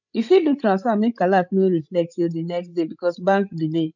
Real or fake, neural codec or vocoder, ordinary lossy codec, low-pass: fake; codec, 16 kHz, 16 kbps, FreqCodec, larger model; none; 7.2 kHz